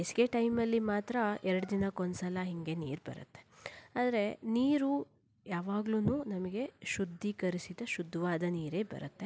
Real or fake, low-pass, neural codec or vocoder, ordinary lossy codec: real; none; none; none